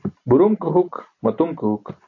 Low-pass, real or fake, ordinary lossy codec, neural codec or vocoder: 7.2 kHz; real; AAC, 48 kbps; none